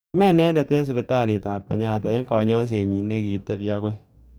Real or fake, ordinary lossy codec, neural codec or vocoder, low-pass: fake; none; codec, 44.1 kHz, 2.6 kbps, DAC; none